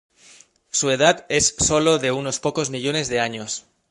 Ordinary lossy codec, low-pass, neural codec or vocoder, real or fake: MP3, 48 kbps; 14.4 kHz; codec, 44.1 kHz, 7.8 kbps, Pupu-Codec; fake